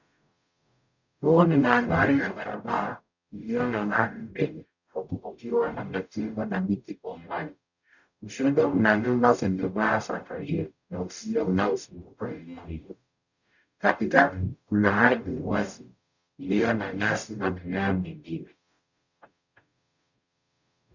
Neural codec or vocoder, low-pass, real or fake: codec, 44.1 kHz, 0.9 kbps, DAC; 7.2 kHz; fake